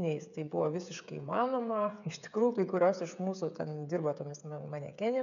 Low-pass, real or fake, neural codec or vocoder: 7.2 kHz; fake; codec, 16 kHz, 8 kbps, FreqCodec, smaller model